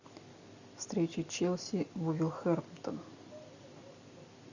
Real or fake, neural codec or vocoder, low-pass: real; none; 7.2 kHz